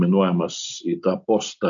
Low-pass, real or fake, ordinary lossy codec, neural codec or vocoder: 7.2 kHz; real; MP3, 64 kbps; none